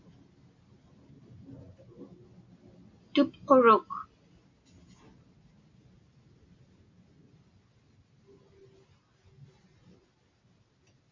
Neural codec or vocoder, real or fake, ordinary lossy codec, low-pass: none; real; MP3, 64 kbps; 7.2 kHz